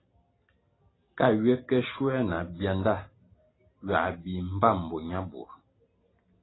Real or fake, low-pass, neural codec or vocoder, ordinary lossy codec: real; 7.2 kHz; none; AAC, 16 kbps